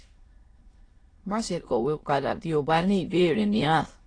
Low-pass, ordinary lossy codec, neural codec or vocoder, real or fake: 9.9 kHz; AAC, 32 kbps; autoencoder, 22.05 kHz, a latent of 192 numbers a frame, VITS, trained on many speakers; fake